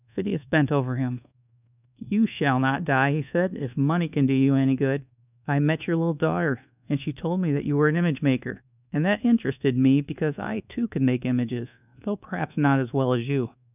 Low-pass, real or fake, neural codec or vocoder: 3.6 kHz; fake; codec, 24 kHz, 1.2 kbps, DualCodec